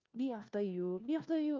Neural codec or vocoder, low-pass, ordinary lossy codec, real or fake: codec, 16 kHz, 1 kbps, FunCodec, trained on LibriTTS, 50 frames a second; 7.2 kHz; Opus, 32 kbps; fake